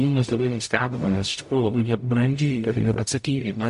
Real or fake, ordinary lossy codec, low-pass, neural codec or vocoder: fake; MP3, 48 kbps; 14.4 kHz; codec, 44.1 kHz, 0.9 kbps, DAC